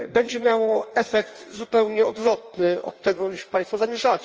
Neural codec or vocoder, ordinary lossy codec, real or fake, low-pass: codec, 16 kHz in and 24 kHz out, 1.1 kbps, FireRedTTS-2 codec; Opus, 24 kbps; fake; 7.2 kHz